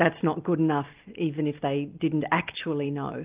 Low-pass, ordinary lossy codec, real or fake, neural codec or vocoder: 3.6 kHz; Opus, 24 kbps; real; none